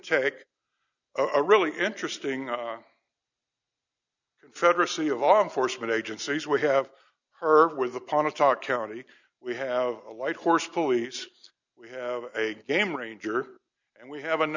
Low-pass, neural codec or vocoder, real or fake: 7.2 kHz; none; real